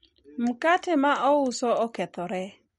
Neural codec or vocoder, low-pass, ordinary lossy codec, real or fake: none; 19.8 kHz; MP3, 48 kbps; real